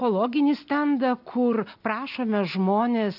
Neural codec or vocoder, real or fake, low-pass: none; real; 5.4 kHz